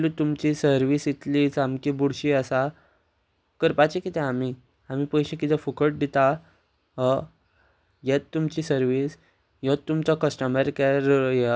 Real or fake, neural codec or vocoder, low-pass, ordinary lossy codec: real; none; none; none